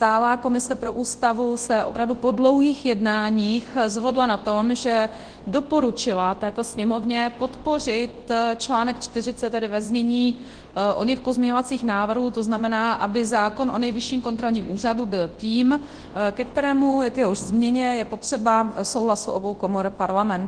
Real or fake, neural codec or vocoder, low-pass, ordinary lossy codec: fake; codec, 24 kHz, 0.9 kbps, WavTokenizer, large speech release; 9.9 kHz; Opus, 16 kbps